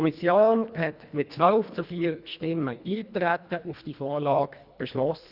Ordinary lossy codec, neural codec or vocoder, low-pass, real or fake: Opus, 64 kbps; codec, 24 kHz, 1.5 kbps, HILCodec; 5.4 kHz; fake